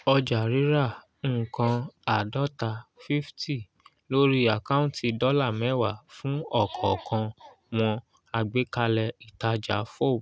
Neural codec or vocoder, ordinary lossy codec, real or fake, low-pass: none; none; real; none